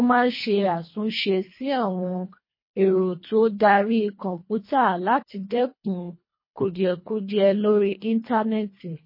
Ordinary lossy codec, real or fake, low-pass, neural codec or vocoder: MP3, 24 kbps; fake; 5.4 kHz; codec, 24 kHz, 1.5 kbps, HILCodec